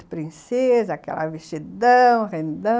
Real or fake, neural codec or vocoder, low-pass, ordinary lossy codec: real; none; none; none